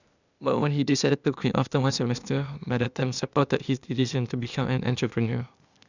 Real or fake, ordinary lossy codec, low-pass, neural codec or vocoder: fake; none; 7.2 kHz; codec, 16 kHz, 0.8 kbps, ZipCodec